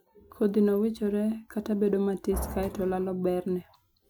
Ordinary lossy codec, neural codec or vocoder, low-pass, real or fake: none; none; none; real